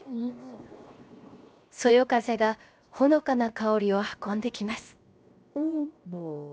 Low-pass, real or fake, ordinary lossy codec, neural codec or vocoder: none; fake; none; codec, 16 kHz, 0.7 kbps, FocalCodec